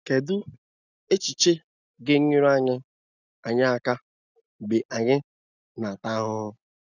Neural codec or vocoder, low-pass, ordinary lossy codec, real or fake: none; 7.2 kHz; none; real